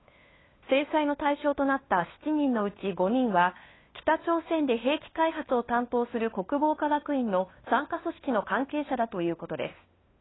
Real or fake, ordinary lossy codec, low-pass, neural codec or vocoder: fake; AAC, 16 kbps; 7.2 kHz; codec, 16 kHz, 2 kbps, FunCodec, trained on LibriTTS, 25 frames a second